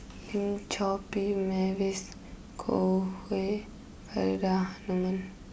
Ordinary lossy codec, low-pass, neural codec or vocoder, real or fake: none; none; none; real